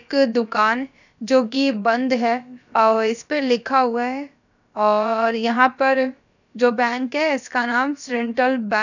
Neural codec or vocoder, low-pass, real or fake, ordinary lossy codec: codec, 16 kHz, about 1 kbps, DyCAST, with the encoder's durations; 7.2 kHz; fake; none